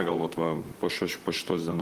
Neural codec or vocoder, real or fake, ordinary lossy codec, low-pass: vocoder, 44.1 kHz, 128 mel bands, Pupu-Vocoder; fake; Opus, 24 kbps; 14.4 kHz